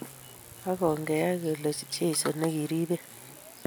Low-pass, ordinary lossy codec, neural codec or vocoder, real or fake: none; none; none; real